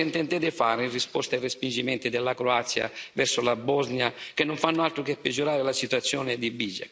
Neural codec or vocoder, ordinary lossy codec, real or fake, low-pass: none; none; real; none